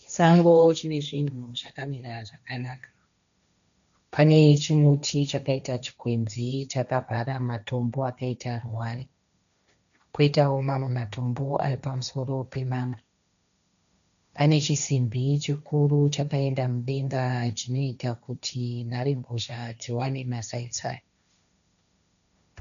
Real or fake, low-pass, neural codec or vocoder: fake; 7.2 kHz; codec, 16 kHz, 1.1 kbps, Voila-Tokenizer